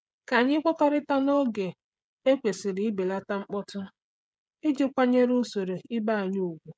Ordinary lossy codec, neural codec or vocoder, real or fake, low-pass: none; codec, 16 kHz, 16 kbps, FreqCodec, smaller model; fake; none